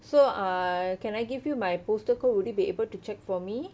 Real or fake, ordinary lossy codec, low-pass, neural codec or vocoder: real; none; none; none